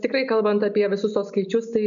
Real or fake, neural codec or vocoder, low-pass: real; none; 7.2 kHz